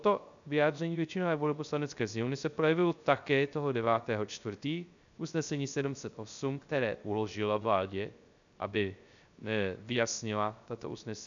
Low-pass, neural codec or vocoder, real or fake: 7.2 kHz; codec, 16 kHz, 0.3 kbps, FocalCodec; fake